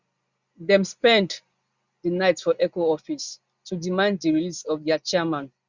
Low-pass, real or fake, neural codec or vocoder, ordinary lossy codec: 7.2 kHz; real; none; Opus, 64 kbps